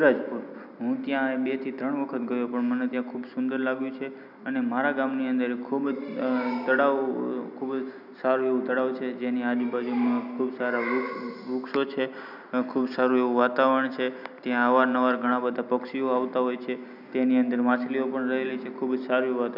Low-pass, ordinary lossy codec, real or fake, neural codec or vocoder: 5.4 kHz; none; real; none